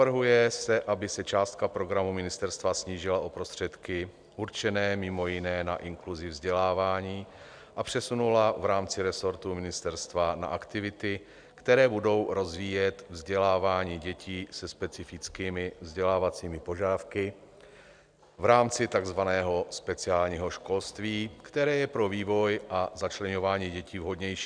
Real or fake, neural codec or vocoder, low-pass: real; none; 9.9 kHz